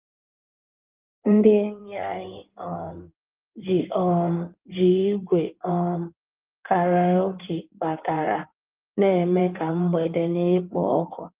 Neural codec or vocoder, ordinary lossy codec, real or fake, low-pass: codec, 16 kHz in and 24 kHz out, 2.2 kbps, FireRedTTS-2 codec; Opus, 32 kbps; fake; 3.6 kHz